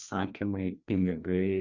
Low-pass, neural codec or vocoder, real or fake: 7.2 kHz; codec, 16 kHz, 2 kbps, FreqCodec, larger model; fake